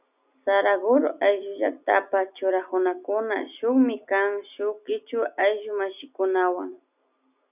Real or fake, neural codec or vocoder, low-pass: real; none; 3.6 kHz